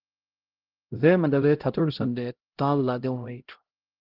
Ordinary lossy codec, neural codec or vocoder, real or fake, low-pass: Opus, 16 kbps; codec, 16 kHz, 0.5 kbps, X-Codec, HuBERT features, trained on LibriSpeech; fake; 5.4 kHz